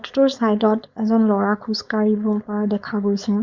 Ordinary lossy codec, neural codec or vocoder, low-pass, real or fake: Opus, 64 kbps; codec, 16 kHz, 4.8 kbps, FACodec; 7.2 kHz; fake